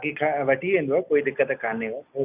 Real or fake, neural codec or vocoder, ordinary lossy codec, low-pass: real; none; none; 3.6 kHz